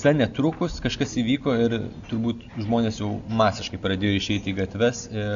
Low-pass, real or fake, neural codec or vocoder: 7.2 kHz; real; none